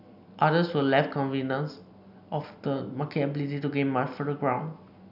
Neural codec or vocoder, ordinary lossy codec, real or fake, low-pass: none; none; real; 5.4 kHz